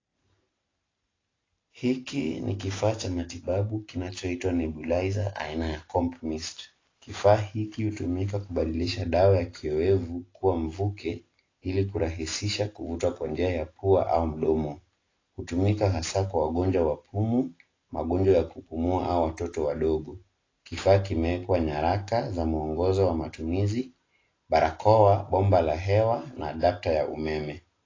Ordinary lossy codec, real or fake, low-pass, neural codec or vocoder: AAC, 32 kbps; real; 7.2 kHz; none